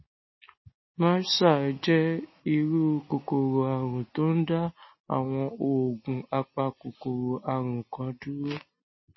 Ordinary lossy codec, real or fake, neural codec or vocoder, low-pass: MP3, 24 kbps; real; none; 7.2 kHz